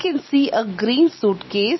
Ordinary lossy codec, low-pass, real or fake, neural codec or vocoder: MP3, 24 kbps; 7.2 kHz; real; none